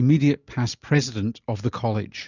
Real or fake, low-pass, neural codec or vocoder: real; 7.2 kHz; none